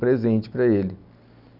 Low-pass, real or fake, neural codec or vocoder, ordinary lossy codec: 5.4 kHz; real; none; none